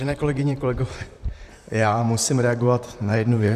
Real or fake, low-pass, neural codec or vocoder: fake; 14.4 kHz; vocoder, 44.1 kHz, 128 mel bands, Pupu-Vocoder